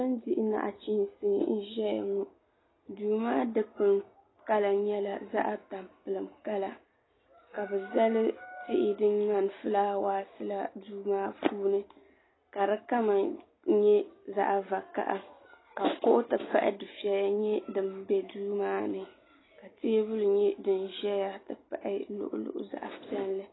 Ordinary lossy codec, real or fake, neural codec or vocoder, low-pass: AAC, 16 kbps; real; none; 7.2 kHz